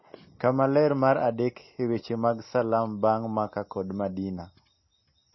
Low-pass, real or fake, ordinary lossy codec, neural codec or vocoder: 7.2 kHz; real; MP3, 24 kbps; none